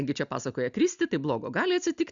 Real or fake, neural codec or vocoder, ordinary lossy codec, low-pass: real; none; Opus, 64 kbps; 7.2 kHz